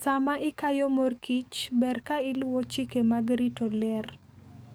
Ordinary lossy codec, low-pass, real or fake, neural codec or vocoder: none; none; fake; codec, 44.1 kHz, 7.8 kbps, DAC